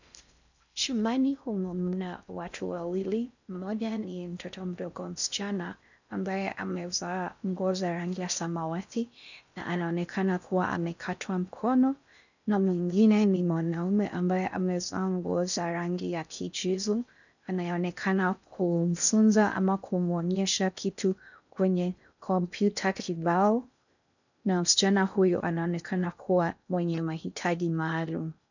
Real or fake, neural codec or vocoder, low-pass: fake; codec, 16 kHz in and 24 kHz out, 0.6 kbps, FocalCodec, streaming, 4096 codes; 7.2 kHz